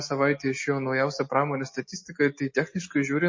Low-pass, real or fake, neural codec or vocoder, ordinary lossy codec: 7.2 kHz; real; none; MP3, 32 kbps